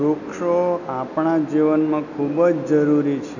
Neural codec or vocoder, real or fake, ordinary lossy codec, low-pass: none; real; none; 7.2 kHz